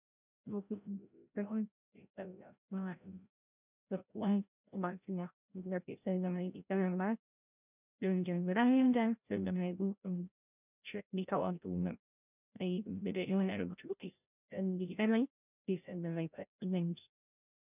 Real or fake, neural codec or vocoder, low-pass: fake; codec, 16 kHz, 0.5 kbps, FreqCodec, larger model; 3.6 kHz